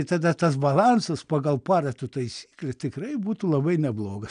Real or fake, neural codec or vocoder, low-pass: real; none; 9.9 kHz